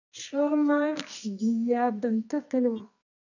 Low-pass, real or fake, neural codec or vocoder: 7.2 kHz; fake; codec, 24 kHz, 0.9 kbps, WavTokenizer, medium music audio release